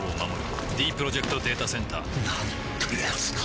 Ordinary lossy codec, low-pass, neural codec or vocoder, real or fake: none; none; none; real